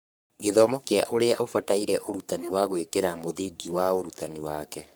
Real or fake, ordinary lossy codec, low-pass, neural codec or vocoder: fake; none; none; codec, 44.1 kHz, 3.4 kbps, Pupu-Codec